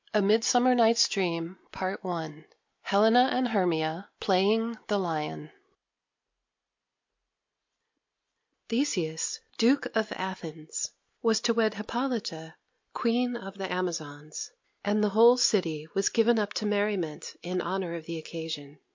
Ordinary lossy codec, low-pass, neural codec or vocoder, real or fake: MP3, 48 kbps; 7.2 kHz; none; real